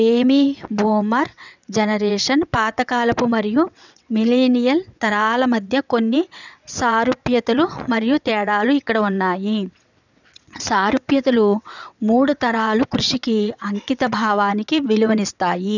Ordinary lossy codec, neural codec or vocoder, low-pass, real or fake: none; vocoder, 22.05 kHz, 80 mel bands, WaveNeXt; 7.2 kHz; fake